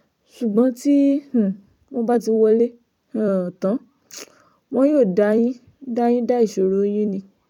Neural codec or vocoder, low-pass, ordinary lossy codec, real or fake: vocoder, 44.1 kHz, 128 mel bands every 256 samples, BigVGAN v2; 19.8 kHz; none; fake